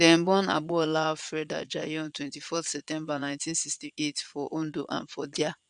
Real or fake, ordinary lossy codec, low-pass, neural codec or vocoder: fake; none; 9.9 kHz; vocoder, 22.05 kHz, 80 mel bands, Vocos